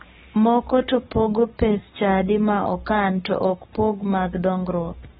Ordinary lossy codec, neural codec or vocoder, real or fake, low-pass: AAC, 16 kbps; codec, 44.1 kHz, 7.8 kbps, Pupu-Codec; fake; 19.8 kHz